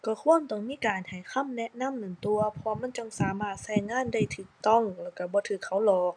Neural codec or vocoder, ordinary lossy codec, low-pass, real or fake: none; none; 9.9 kHz; real